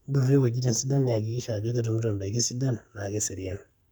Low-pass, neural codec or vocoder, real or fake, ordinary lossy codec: none; codec, 44.1 kHz, 2.6 kbps, SNAC; fake; none